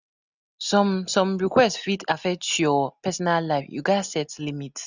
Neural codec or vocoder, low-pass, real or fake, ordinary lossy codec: none; 7.2 kHz; real; none